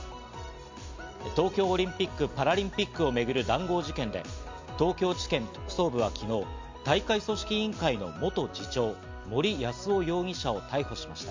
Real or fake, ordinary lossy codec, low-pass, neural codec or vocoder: real; MP3, 64 kbps; 7.2 kHz; none